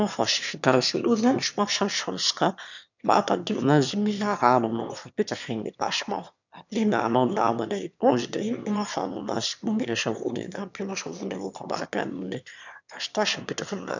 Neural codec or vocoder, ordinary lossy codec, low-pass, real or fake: autoencoder, 22.05 kHz, a latent of 192 numbers a frame, VITS, trained on one speaker; none; 7.2 kHz; fake